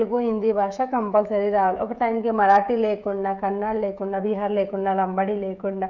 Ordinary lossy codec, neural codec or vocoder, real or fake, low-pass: none; codec, 16 kHz, 16 kbps, FreqCodec, smaller model; fake; 7.2 kHz